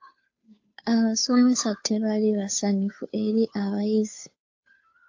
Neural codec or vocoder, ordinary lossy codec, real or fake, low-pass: codec, 16 kHz, 2 kbps, FunCodec, trained on Chinese and English, 25 frames a second; AAC, 48 kbps; fake; 7.2 kHz